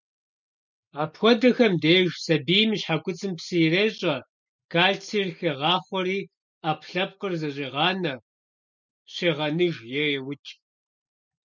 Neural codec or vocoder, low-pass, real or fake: none; 7.2 kHz; real